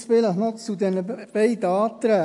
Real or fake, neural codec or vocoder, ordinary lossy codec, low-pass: real; none; AAC, 64 kbps; 10.8 kHz